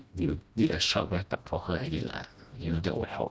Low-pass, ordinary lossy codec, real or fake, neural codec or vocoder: none; none; fake; codec, 16 kHz, 1 kbps, FreqCodec, smaller model